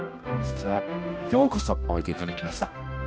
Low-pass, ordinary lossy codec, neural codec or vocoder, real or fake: none; none; codec, 16 kHz, 1 kbps, X-Codec, HuBERT features, trained on balanced general audio; fake